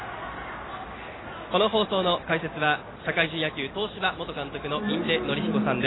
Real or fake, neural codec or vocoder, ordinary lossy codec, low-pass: real; none; AAC, 16 kbps; 7.2 kHz